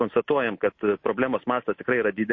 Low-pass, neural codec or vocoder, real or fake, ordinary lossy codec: 7.2 kHz; none; real; MP3, 32 kbps